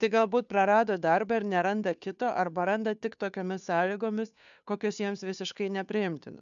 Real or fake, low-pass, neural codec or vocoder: fake; 7.2 kHz; codec, 16 kHz, 2 kbps, FunCodec, trained on Chinese and English, 25 frames a second